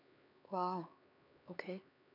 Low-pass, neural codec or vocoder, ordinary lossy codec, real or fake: 5.4 kHz; codec, 16 kHz, 4 kbps, X-Codec, HuBERT features, trained on LibriSpeech; none; fake